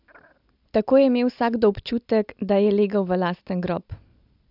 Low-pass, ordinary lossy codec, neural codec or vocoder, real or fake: 5.4 kHz; AAC, 48 kbps; none; real